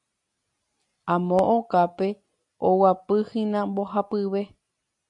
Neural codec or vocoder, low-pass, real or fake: none; 10.8 kHz; real